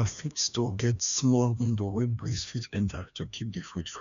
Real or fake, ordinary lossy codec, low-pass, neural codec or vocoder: fake; none; 7.2 kHz; codec, 16 kHz, 1 kbps, FreqCodec, larger model